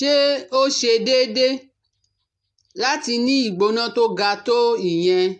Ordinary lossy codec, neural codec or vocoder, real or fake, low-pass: AAC, 64 kbps; none; real; 10.8 kHz